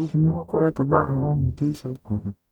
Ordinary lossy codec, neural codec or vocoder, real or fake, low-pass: none; codec, 44.1 kHz, 0.9 kbps, DAC; fake; 19.8 kHz